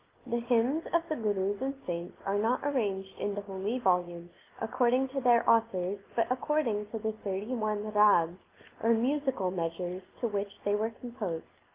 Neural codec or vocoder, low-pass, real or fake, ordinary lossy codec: none; 3.6 kHz; real; Opus, 32 kbps